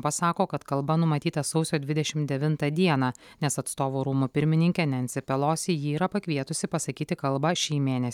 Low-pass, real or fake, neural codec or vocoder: 19.8 kHz; real; none